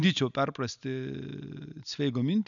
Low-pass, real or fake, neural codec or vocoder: 7.2 kHz; real; none